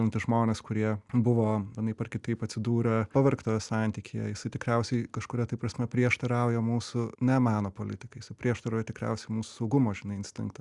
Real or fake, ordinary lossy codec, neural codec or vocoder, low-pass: real; Opus, 64 kbps; none; 10.8 kHz